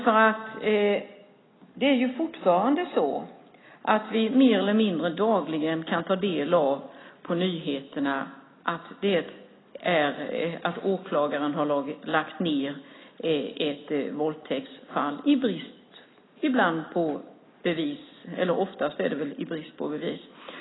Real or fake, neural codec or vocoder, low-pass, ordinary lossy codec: real; none; 7.2 kHz; AAC, 16 kbps